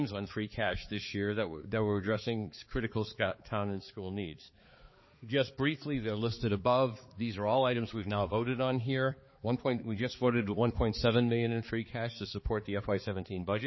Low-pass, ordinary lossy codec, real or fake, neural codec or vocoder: 7.2 kHz; MP3, 24 kbps; fake; codec, 16 kHz, 4 kbps, X-Codec, HuBERT features, trained on balanced general audio